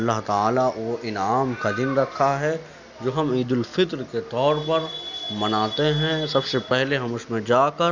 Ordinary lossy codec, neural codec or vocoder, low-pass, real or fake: none; none; 7.2 kHz; real